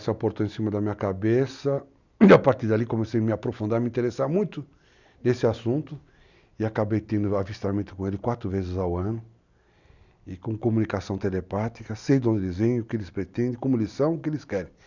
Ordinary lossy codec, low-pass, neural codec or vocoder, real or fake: none; 7.2 kHz; none; real